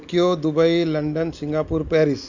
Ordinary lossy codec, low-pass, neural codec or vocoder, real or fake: none; 7.2 kHz; none; real